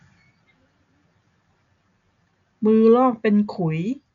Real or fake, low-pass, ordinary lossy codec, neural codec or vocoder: real; 7.2 kHz; none; none